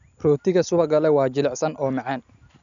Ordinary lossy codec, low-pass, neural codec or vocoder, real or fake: none; 7.2 kHz; none; real